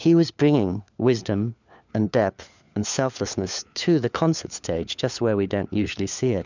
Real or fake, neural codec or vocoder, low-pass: fake; codec, 16 kHz, 4 kbps, FunCodec, trained on LibriTTS, 50 frames a second; 7.2 kHz